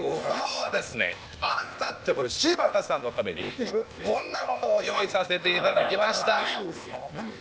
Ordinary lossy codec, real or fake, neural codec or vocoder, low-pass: none; fake; codec, 16 kHz, 0.8 kbps, ZipCodec; none